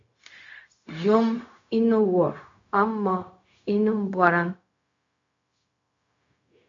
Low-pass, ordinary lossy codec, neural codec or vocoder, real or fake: 7.2 kHz; AAC, 48 kbps; codec, 16 kHz, 0.4 kbps, LongCat-Audio-Codec; fake